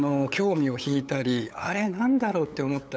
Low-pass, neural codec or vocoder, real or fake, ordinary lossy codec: none; codec, 16 kHz, 8 kbps, FunCodec, trained on LibriTTS, 25 frames a second; fake; none